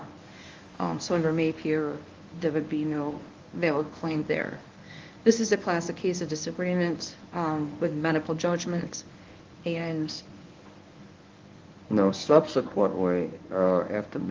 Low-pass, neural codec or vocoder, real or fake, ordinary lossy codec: 7.2 kHz; codec, 24 kHz, 0.9 kbps, WavTokenizer, medium speech release version 1; fake; Opus, 32 kbps